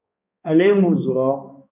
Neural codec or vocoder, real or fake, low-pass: codec, 16 kHz, 2 kbps, X-Codec, HuBERT features, trained on balanced general audio; fake; 3.6 kHz